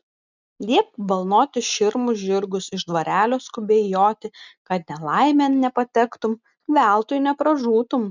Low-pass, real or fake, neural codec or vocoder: 7.2 kHz; real; none